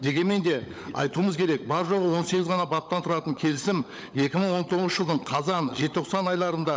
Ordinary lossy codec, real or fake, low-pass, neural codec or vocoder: none; fake; none; codec, 16 kHz, 16 kbps, FunCodec, trained on LibriTTS, 50 frames a second